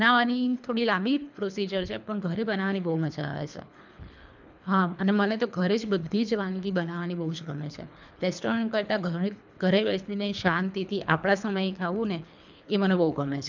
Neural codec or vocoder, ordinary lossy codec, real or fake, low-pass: codec, 24 kHz, 3 kbps, HILCodec; none; fake; 7.2 kHz